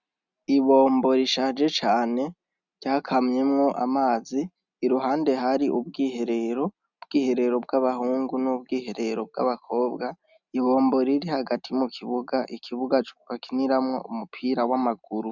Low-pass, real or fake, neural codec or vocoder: 7.2 kHz; real; none